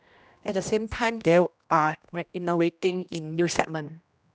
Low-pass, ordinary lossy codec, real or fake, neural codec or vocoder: none; none; fake; codec, 16 kHz, 1 kbps, X-Codec, HuBERT features, trained on general audio